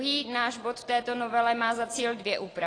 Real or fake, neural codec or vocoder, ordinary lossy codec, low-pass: real; none; AAC, 32 kbps; 9.9 kHz